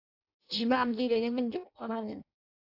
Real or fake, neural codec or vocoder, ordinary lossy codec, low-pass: fake; codec, 16 kHz in and 24 kHz out, 0.6 kbps, FireRedTTS-2 codec; AAC, 48 kbps; 5.4 kHz